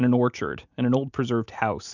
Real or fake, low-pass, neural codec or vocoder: real; 7.2 kHz; none